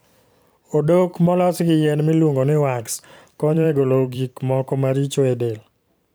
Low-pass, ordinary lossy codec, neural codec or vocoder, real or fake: none; none; vocoder, 44.1 kHz, 128 mel bands every 512 samples, BigVGAN v2; fake